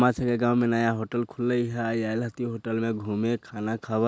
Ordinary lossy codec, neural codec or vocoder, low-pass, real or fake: none; none; none; real